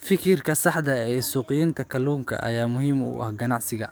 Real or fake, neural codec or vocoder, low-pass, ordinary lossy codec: fake; codec, 44.1 kHz, 7.8 kbps, DAC; none; none